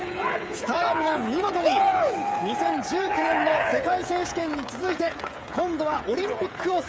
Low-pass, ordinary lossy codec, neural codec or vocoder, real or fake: none; none; codec, 16 kHz, 8 kbps, FreqCodec, smaller model; fake